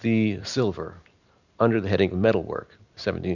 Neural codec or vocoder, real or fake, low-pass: none; real; 7.2 kHz